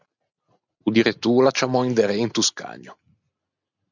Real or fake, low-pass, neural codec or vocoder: real; 7.2 kHz; none